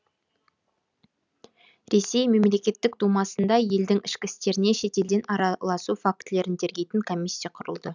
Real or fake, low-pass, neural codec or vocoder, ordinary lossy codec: real; 7.2 kHz; none; none